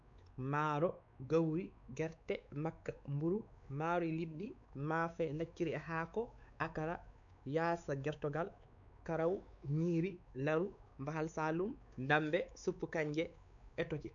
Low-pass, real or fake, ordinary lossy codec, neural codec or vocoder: 7.2 kHz; fake; none; codec, 16 kHz, 4 kbps, X-Codec, WavLM features, trained on Multilingual LibriSpeech